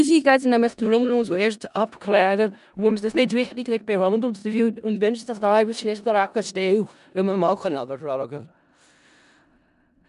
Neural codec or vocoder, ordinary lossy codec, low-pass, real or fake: codec, 16 kHz in and 24 kHz out, 0.4 kbps, LongCat-Audio-Codec, four codebook decoder; none; 10.8 kHz; fake